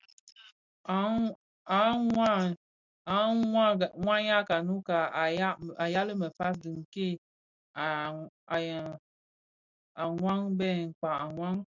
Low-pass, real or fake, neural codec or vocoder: 7.2 kHz; real; none